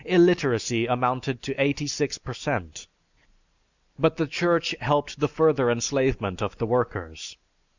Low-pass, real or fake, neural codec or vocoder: 7.2 kHz; real; none